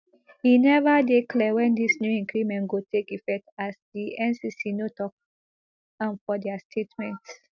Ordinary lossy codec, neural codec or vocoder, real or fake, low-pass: none; none; real; 7.2 kHz